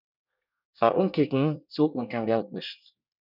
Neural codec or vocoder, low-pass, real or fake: codec, 24 kHz, 1 kbps, SNAC; 5.4 kHz; fake